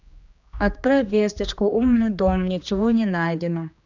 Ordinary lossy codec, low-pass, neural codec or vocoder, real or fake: none; 7.2 kHz; codec, 16 kHz, 2 kbps, X-Codec, HuBERT features, trained on general audio; fake